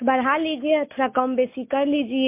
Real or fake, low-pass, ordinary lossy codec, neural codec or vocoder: real; 3.6 kHz; MP3, 24 kbps; none